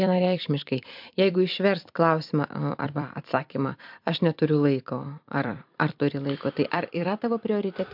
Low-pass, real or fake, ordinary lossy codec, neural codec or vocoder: 5.4 kHz; fake; MP3, 48 kbps; vocoder, 44.1 kHz, 128 mel bands every 256 samples, BigVGAN v2